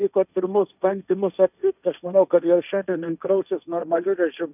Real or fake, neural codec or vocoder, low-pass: fake; codec, 16 kHz, 1.1 kbps, Voila-Tokenizer; 3.6 kHz